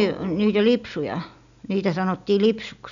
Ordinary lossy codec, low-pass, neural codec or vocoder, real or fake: none; 7.2 kHz; none; real